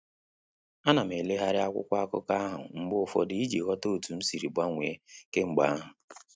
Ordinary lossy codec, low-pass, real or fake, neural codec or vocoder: none; none; real; none